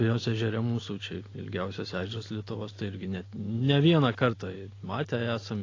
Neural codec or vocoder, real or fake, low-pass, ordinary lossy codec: none; real; 7.2 kHz; AAC, 32 kbps